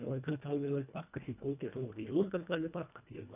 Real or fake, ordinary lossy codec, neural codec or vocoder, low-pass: fake; none; codec, 24 kHz, 1.5 kbps, HILCodec; 3.6 kHz